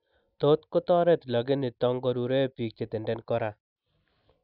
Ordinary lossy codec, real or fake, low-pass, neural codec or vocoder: none; real; 5.4 kHz; none